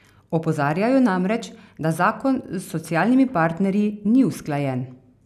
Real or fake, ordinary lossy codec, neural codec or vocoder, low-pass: real; none; none; 14.4 kHz